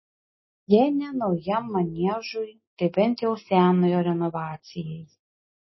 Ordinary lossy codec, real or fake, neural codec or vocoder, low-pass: MP3, 24 kbps; real; none; 7.2 kHz